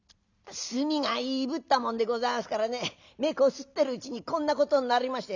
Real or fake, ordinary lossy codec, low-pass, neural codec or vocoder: real; none; 7.2 kHz; none